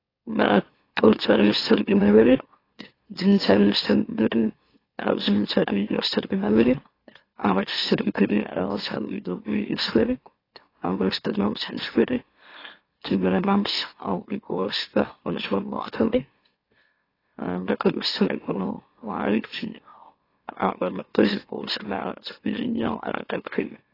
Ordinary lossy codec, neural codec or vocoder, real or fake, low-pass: AAC, 24 kbps; autoencoder, 44.1 kHz, a latent of 192 numbers a frame, MeloTTS; fake; 5.4 kHz